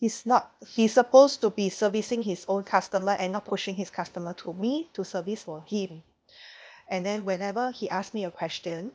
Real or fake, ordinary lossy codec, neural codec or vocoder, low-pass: fake; none; codec, 16 kHz, 0.8 kbps, ZipCodec; none